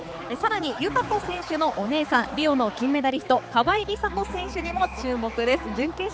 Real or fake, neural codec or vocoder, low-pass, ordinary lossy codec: fake; codec, 16 kHz, 4 kbps, X-Codec, HuBERT features, trained on balanced general audio; none; none